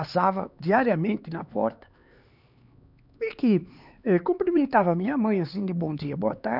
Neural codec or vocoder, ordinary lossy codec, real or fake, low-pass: codec, 16 kHz, 4 kbps, X-Codec, HuBERT features, trained on LibriSpeech; none; fake; 5.4 kHz